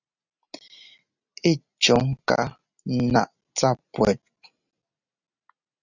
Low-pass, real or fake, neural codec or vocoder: 7.2 kHz; real; none